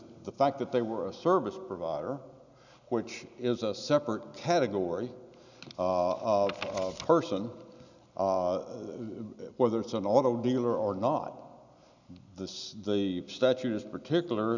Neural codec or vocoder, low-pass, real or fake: none; 7.2 kHz; real